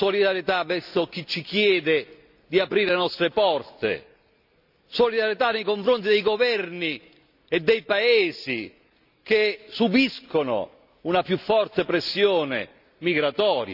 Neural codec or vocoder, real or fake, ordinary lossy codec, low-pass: none; real; none; 5.4 kHz